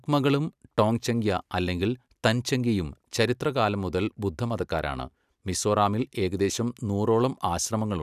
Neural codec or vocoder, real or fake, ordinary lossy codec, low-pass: vocoder, 44.1 kHz, 128 mel bands every 256 samples, BigVGAN v2; fake; none; 14.4 kHz